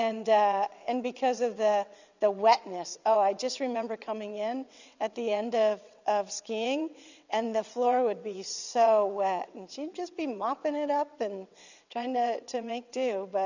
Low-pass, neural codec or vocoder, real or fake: 7.2 kHz; vocoder, 22.05 kHz, 80 mel bands, WaveNeXt; fake